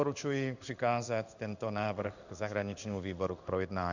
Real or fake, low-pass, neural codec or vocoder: fake; 7.2 kHz; codec, 16 kHz in and 24 kHz out, 1 kbps, XY-Tokenizer